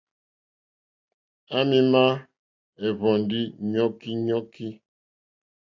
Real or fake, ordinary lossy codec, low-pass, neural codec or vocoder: real; AAC, 48 kbps; 7.2 kHz; none